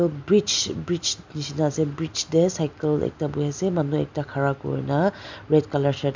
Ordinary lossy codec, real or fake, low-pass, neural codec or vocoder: MP3, 64 kbps; real; 7.2 kHz; none